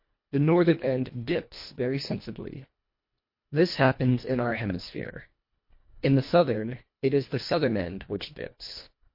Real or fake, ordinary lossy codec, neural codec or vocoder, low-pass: fake; MP3, 32 kbps; codec, 24 kHz, 1.5 kbps, HILCodec; 5.4 kHz